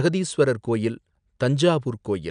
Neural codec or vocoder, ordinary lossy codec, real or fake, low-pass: none; none; real; 9.9 kHz